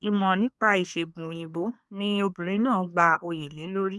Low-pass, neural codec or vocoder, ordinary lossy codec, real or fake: none; codec, 24 kHz, 1 kbps, SNAC; none; fake